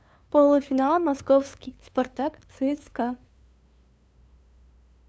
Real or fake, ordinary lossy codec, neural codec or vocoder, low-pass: fake; none; codec, 16 kHz, 2 kbps, FunCodec, trained on LibriTTS, 25 frames a second; none